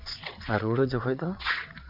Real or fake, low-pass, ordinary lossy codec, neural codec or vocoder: fake; 5.4 kHz; none; vocoder, 22.05 kHz, 80 mel bands, WaveNeXt